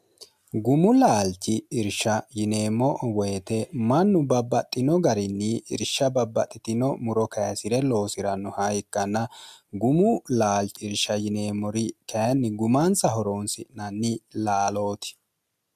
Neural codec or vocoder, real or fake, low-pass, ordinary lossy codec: none; real; 14.4 kHz; MP3, 96 kbps